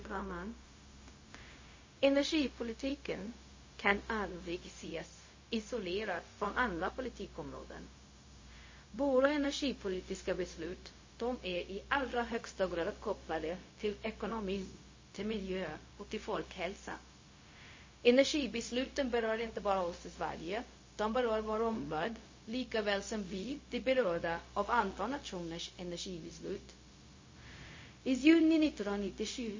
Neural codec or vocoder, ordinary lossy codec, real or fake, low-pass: codec, 16 kHz, 0.4 kbps, LongCat-Audio-Codec; MP3, 32 kbps; fake; 7.2 kHz